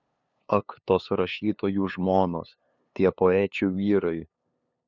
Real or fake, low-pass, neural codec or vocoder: fake; 7.2 kHz; codec, 16 kHz, 2 kbps, FunCodec, trained on LibriTTS, 25 frames a second